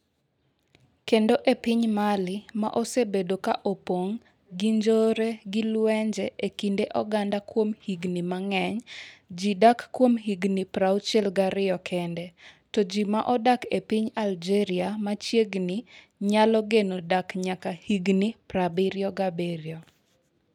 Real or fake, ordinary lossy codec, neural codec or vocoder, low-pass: real; none; none; 19.8 kHz